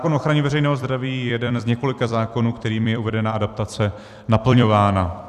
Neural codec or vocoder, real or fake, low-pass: vocoder, 44.1 kHz, 128 mel bands every 256 samples, BigVGAN v2; fake; 14.4 kHz